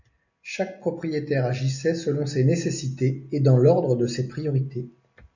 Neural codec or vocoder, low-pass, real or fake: none; 7.2 kHz; real